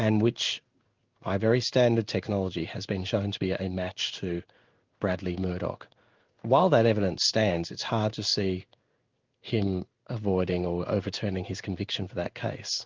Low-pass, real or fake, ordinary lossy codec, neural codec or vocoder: 7.2 kHz; fake; Opus, 24 kbps; codec, 16 kHz in and 24 kHz out, 1 kbps, XY-Tokenizer